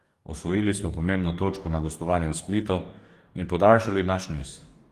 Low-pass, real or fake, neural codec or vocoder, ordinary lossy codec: 14.4 kHz; fake; codec, 44.1 kHz, 2.6 kbps, DAC; Opus, 24 kbps